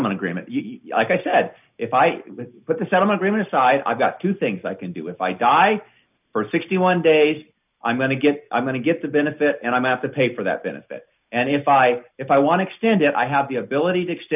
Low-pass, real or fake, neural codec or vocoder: 3.6 kHz; real; none